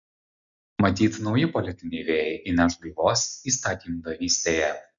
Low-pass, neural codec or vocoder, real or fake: 7.2 kHz; none; real